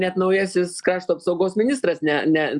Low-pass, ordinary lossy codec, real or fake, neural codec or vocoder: 10.8 kHz; MP3, 96 kbps; real; none